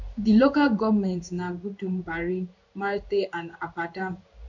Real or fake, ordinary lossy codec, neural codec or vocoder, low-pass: fake; none; codec, 16 kHz in and 24 kHz out, 1 kbps, XY-Tokenizer; 7.2 kHz